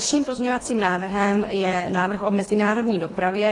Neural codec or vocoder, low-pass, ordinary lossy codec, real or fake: codec, 24 kHz, 1.5 kbps, HILCodec; 10.8 kHz; AAC, 32 kbps; fake